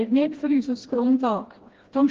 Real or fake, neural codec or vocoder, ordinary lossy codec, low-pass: fake; codec, 16 kHz, 1 kbps, FreqCodec, smaller model; Opus, 16 kbps; 7.2 kHz